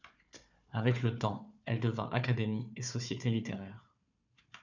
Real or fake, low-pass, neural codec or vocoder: fake; 7.2 kHz; codec, 16 kHz, 4 kbps, FunCodec, trained on Chinese and English, 50 frames a second